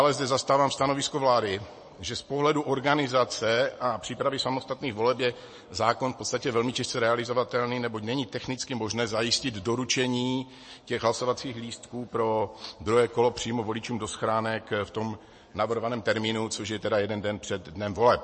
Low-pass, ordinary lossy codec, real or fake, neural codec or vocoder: 10.8 kHz; MP3, 32 kbps; real; none